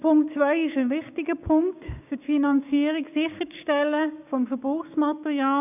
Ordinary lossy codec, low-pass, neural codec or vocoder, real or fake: none; 3.6 kHz; codec, 16 kHz, 6 kbps, DAC; fake